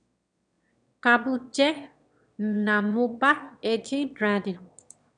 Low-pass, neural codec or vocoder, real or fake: 9.9 kHz; autoencoder, 22.05 kHz, a latent of 192 numbers a frame, VITS, trained on one speaker; fake